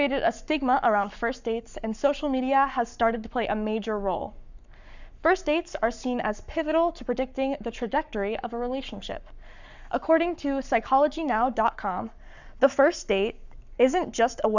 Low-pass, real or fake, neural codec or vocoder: 7.2 kHz; fake; codec, 44.1 kHz, 7.8 kbps, Pupu-Codec